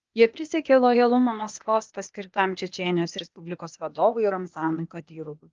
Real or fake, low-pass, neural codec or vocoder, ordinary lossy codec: fake; 7.2 kHz; codec, 16 kHz, 0.8 kbps, ZipCodec; Opus, 32 kbps